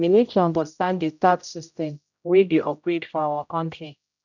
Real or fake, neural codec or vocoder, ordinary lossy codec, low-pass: fake; codec, 16 kHz, 0.5 kbps, X-Codec, HuBERT features, trained on general audio; none; 7.2 kHz